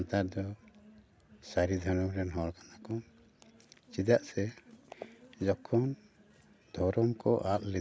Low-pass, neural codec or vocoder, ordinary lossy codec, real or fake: none; none; none; real